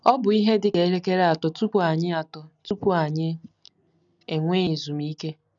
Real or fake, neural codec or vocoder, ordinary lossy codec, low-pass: real; none; none; 7.2 kHz